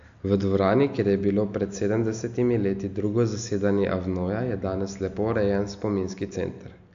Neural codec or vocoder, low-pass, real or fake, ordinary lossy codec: none; 7.2 kHz; real; AAC, 64 kbps